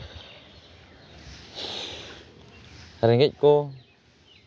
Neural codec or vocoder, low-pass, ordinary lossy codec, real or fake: none; none; none; real